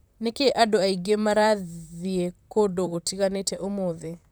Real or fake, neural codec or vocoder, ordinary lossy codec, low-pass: fake; vocoder, 44.1 kHz, 128 mel bands, Pupu-Vocoder; none; none